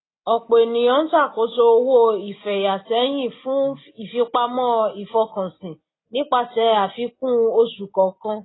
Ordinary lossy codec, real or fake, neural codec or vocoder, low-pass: AAC, 16 kbps; real; none; 7.2 kHz